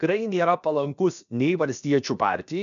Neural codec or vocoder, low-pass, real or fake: codec, 16 kHz, about 1 kbps, DyCAST, with the encoder's durations; 7.2 kHz; fake